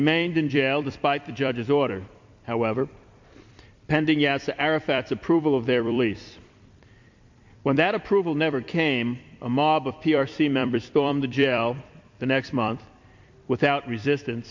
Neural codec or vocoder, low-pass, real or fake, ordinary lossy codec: vocoder, 44.1 kHz, 128 mel bands every 256 samples, BigVGAN v2; 7.2 kHz; fake; MP3, 48 kbps